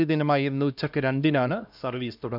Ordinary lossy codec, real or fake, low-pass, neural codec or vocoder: none; fake; 5.4 kHz; codec, 16 kHz, 1 kbps, X-Codec, HuBERT features, trained on LibriSpeech